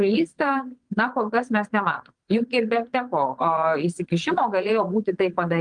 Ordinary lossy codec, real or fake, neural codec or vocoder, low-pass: Opus, 24 kbps; fake; vocoder, 22.05 kHz, 80 mel bands, Vocos; 9.9 kHz